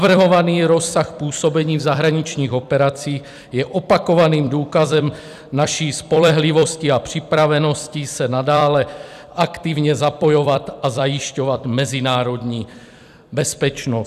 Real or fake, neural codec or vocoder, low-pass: fake; vocoder, 44.1 kHz, 128 mel bands every 256 samples, BigVGAN v2; 14.4 kHz